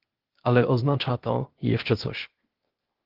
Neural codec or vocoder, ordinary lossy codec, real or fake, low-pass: codec, 16 kHz, 0.8 kbps, ZipCodec; Opus, 16 kbps; fake; 5.4 kHz